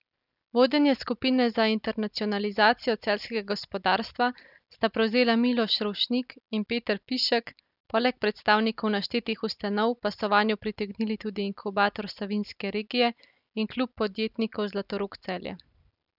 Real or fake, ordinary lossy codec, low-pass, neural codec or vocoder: real; none; 5.4 kHz; none